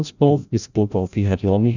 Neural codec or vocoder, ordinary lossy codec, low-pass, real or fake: codec, 16 kHz, 0.5 kbps, FreqCodec, larger model; none; 7.2 kHz; fake